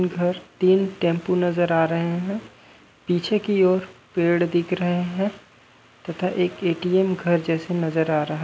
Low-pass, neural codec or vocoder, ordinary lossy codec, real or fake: none; none; none; real